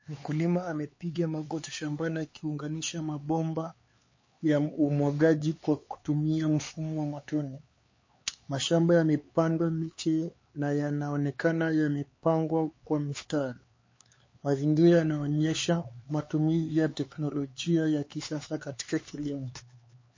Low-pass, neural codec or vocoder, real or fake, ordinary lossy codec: 7.2 kHz; codec, 16 kHz, 4 kbps, X-Codec, HuBERT features, trained on LibriSpeech; fake; MP3, 32 kbps